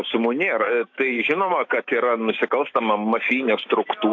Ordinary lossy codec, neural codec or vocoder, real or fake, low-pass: AAC, 48 kbps; autoencoder, 48 kHz, 128 numbers a frame, DAC-VAE, trained on Japanese speech; fake; 7.2 kHz